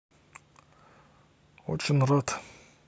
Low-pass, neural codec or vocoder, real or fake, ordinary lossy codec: none; none; real; none